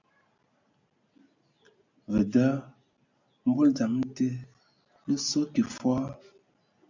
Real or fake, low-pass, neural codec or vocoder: real; 7.2 kHz; none